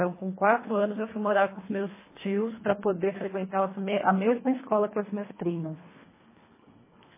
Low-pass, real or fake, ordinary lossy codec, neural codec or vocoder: 3.6 kHz; fake; MP3, 16 kbps; codec, 24 kHz, 1.5 kbps, HILCodec